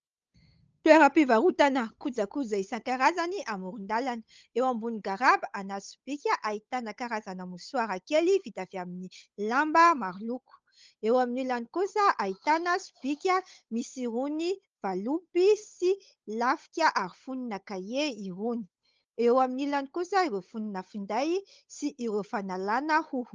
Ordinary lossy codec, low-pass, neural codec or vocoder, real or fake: Opus, 24 kbps; 7.2 kHz; codec, 16 kHz, 8 kbps, FunCodec, trained on Chinese and English, 25 frames a second; fake